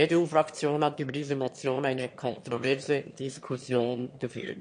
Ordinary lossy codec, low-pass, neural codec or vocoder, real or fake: MP3, 48 kbps; 9.9 kHz; autoencoder, 22.05 kHz, a latent of 192 numbers a frame, VITS, trained on one speaker; fake